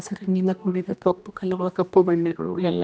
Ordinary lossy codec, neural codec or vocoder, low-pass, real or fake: none; codec, 16 kHz, 1 kbps, X-Codec, HuBERT features, trained on general audio; none; fake